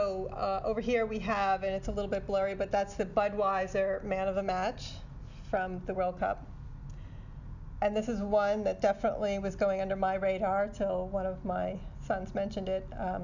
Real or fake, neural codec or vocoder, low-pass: real; none; 7.2 kHz